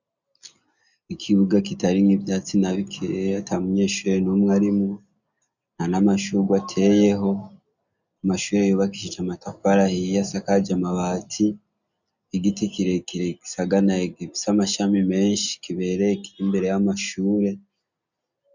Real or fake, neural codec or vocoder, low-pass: real; none; 7.2 kHz